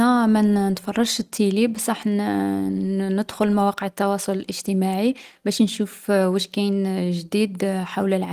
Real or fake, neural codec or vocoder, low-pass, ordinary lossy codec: real; none; 14.4 kHz; Opus, 24 kbps